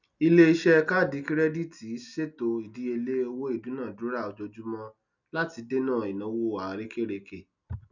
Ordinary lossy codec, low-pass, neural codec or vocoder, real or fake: none; 7.2 kHz; none; real